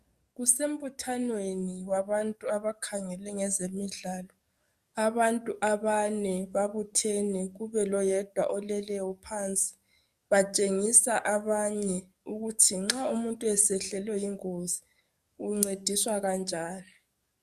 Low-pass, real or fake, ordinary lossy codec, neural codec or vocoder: 14.4 kHz; fake; Opus, 64 kbps; codec, 44.1 kHz, 7.8 kbps, DAC